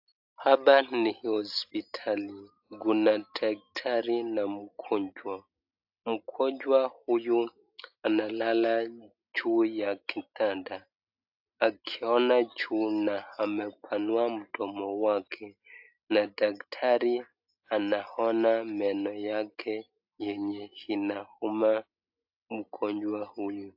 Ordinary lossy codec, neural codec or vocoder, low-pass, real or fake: AAC, 48 kbps; none; 5.4 kHz; real